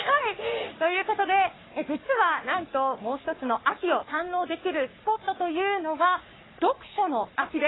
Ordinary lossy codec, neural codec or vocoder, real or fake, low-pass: AAC, 16 kbps; codec, 44.1 kHz, 3.4 kbps, Pupu-Codec; fake; 7.2 kHz